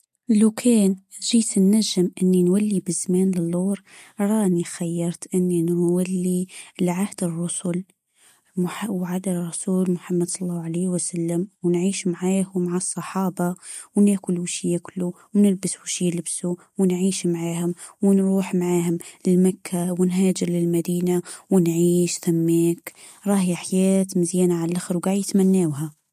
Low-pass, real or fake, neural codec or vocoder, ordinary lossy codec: 14.4 kHz; real; none; MP3, 64 kbps